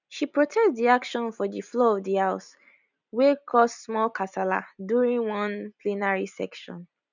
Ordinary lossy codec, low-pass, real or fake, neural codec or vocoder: none; 7.2 kHz; real; none